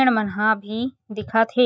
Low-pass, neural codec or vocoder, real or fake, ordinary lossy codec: none; none; real; none